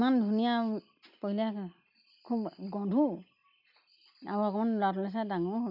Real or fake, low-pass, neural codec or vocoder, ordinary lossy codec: real; 5.4 kHz; none; none